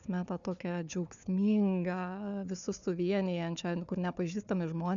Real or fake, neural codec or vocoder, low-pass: fake; codec, 16 kHz, 4 kbps, FunCodec, trained on Chinese and English, 50 frames a second; 7.2 kHz